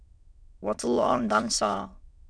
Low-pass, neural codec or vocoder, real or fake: 9.9 kHz; autoencoder, 22.05 kHz, a latent of 192 numbers a frame, VITS, trained on many speakers; fake